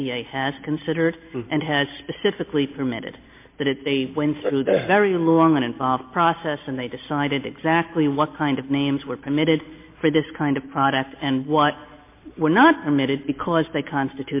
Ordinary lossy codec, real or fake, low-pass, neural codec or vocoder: MP3, 24 kbps; real; 3.6 kHz; none